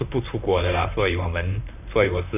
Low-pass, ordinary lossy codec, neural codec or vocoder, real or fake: 3.6 kHz; none; vocoder, 44.1 kHz, 128 mel bands, Pupu-Vocoder; fake